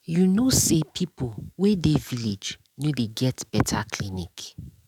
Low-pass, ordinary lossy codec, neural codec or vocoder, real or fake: 19.8 kHz; none; codec, 44.1 kHz, 7.8 kbps, DAC; fake